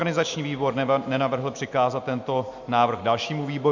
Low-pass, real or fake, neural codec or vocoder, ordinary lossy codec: 7.2 kHz; real; none; MP3, 64 kbps